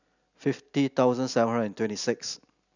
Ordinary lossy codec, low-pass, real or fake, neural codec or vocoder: none; 7.2 kHz; real; none